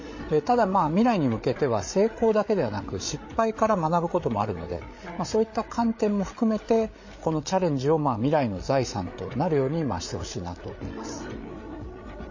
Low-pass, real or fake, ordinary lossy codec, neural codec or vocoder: 7.2 kHz; fake; MP3, 32 kbps; codec, 16 kHz, 8 kbps, FreqCodec, larger model